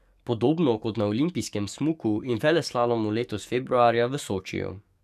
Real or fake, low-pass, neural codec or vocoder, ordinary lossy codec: fake; 14.4 kHz; codec, 44.1 kHz, 7.8 kbps, DAC; none